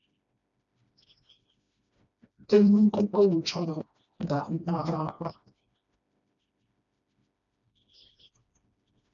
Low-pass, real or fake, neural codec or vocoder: 7.2 kHz; fake; codec, 16 kHz, 1 kbps, FreqCodec, smaller model